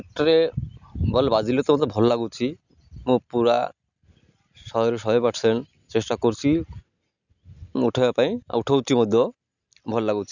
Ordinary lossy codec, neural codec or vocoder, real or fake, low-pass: none; none; real; 7.2 kHz